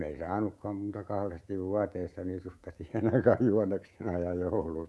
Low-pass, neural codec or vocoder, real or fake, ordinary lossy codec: none; codec, 24 kHz, 3.1 kbps, DualCodec; fake; none